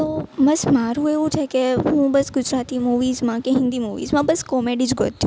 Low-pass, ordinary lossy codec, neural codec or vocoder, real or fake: none; none; none; real